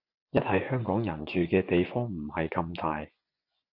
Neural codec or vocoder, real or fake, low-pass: vocoder, 24 kHz, 100 mel bands, Vocos; fake; 5.4 kHz